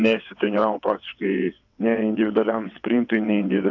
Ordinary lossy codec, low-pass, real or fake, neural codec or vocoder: AAC, 48 kbps; 7.2 kHz; fake; vocoder, 22.05 kHz, 80 mel bands, WaveNeXt